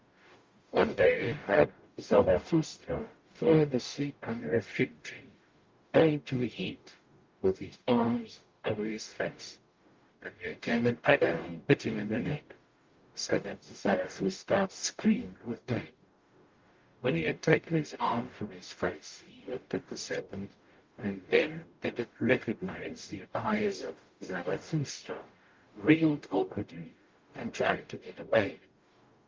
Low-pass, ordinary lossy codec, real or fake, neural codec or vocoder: 7.2 kHz; Opus, 32 kbps; fake; codec, 44.1 kHz, 0.9 kbps, DAC